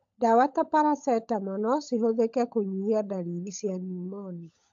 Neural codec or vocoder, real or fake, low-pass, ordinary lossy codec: codec, 16 kHz, 16 kbps, FunCodec, trained on LibriTTS, 50 frames a second; fake; 7.2 kHz; AAC, 64 kbps